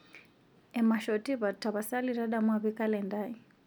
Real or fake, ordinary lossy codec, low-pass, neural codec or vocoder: real; none; 19.8 kHz; none